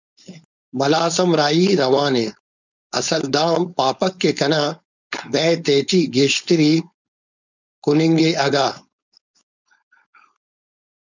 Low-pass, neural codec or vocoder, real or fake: 7.2 kHz; codec, 16 kHz, 4.8 kbps, FACodec; fake